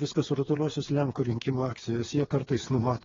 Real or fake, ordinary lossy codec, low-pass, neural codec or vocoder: fake; AAC, 24 kbps; 7.2 kHz; codec, 16 kHz, 4 kbps, FreqCodec, smaller model